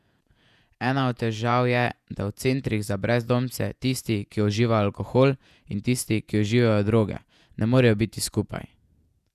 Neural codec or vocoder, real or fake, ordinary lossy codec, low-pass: vocoder, 48 kHz, 128 mel bands, Vocos; fake; none; 14.4 kHz